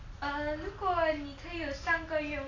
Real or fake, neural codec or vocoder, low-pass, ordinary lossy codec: real; none; 7.2 kHz; AAC, 32 kbps